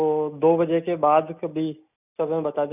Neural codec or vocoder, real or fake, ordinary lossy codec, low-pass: none; real; none; 3.6 kHz